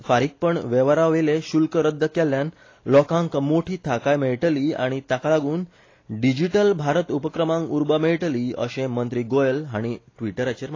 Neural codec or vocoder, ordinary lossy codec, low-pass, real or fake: none; AAC, 32 kbps; 7.2 kHz; real